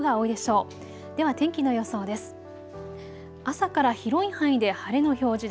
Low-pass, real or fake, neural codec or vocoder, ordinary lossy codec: none; real; none; none